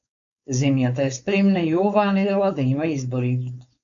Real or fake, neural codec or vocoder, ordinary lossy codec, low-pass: fake; codec, 16 kHz, 4.8 kbps, FACodec; AAC, 64 kbps; 7.2 kHz